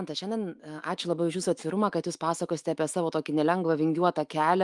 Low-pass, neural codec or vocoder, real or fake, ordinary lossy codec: 10.8 kHz; autoencoder, 48 kHz, 128 numbers a frame, DAC-VAE, trained on Japanese speech; fake; Opus, 32 kbps